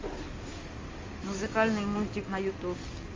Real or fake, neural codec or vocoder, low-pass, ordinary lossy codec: fake; codec, 16 kHz in and 24 kHz out, 2.2 kbps, FireRedTTS-2 codec; 7.2 kHz; Opus, 32 kbps